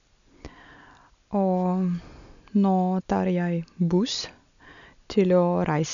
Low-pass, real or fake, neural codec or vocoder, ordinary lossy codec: 7.2 kHz; real; none; none